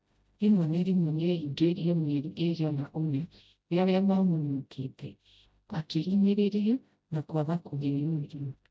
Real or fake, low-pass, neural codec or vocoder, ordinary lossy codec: fake; none; codec, 16 kHz, 0.5 kbps, FreqCodec, smaller model; none